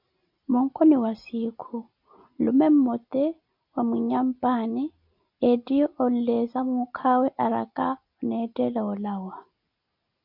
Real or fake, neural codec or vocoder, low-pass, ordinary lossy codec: real; none; 5.4 kHz; MP3, 48 kbps